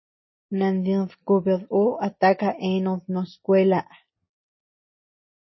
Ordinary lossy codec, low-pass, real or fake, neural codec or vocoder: MP3, 24 kbps; 7.2 kHz; real; none